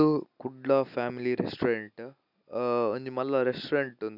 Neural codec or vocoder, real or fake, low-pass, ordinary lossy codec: none; real; 5.4 kHz; none